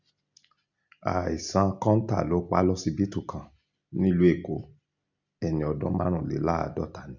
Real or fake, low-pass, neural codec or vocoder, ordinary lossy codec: real; 7.2 kHz; none; none